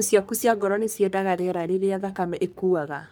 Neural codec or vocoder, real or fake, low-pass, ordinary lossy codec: codec, 44.1 kHz, 3.4 kbps, Pupu-Codec; fake; none; none